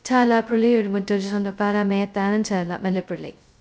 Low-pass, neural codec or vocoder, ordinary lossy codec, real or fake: none; codec, 16 kHz, 0.2 kbps, FocalCodec; none; fake